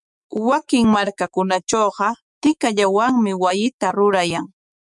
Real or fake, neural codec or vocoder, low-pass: fake; autoencoder, 48 kHz, 128 numbers a frame, DAC-VAE, trained on Japanese speech; 10.8 kHz